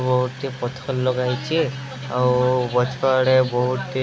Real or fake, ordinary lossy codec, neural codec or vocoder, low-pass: real; none; none; none